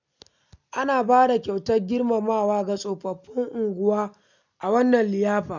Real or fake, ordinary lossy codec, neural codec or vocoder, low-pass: real; none; none; 7.2 kHz